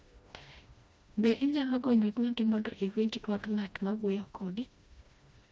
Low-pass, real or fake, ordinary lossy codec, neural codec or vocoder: none; fake; none; codec, 16 kHz, 1 kbps, FreqCodec, smaller model